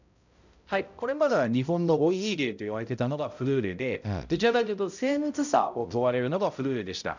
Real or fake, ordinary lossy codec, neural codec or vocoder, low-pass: fake; none; codec, 16 kHz, 0.5 kbps, X-Codec, HuBERT features, trained on balanced general audio; 7.2 kHz